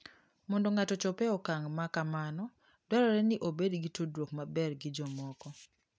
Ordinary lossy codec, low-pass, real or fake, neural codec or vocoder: none; none; real; none